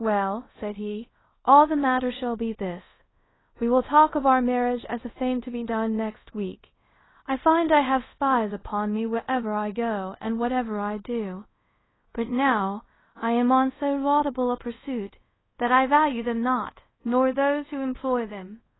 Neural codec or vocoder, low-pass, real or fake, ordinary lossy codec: codec, 24 kHz, 0.5 kbps, DualCodec; 7.2 kHz; fake; AAC, 16 kbps